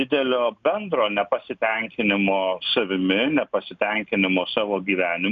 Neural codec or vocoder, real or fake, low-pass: none; real; 7.2 kHz